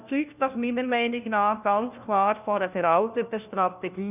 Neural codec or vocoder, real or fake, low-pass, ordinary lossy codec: codec, 16 kHz, 1 kbps, FunCodec, trained on LibriTTS, 50 frames a second; fake; 3.6 kHz; none